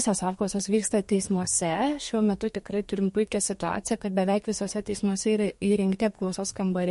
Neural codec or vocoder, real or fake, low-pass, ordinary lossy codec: codec, 32 kHz, 1.9 kbps, SNAC; fake; 14.4 kHz; MP3, 48 kbps